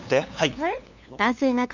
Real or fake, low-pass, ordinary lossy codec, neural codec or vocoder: fake; 7.2 kHz; none; codec, 16 kHz, 2 kbps, FunCodec, trained on LibriTTS, 25 frames a second